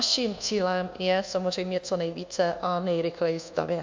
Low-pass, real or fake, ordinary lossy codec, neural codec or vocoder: 7.2 kHz; fake; MP3, 64 kbps; codec, 24 kHz, 1.2 kbps, DualCodec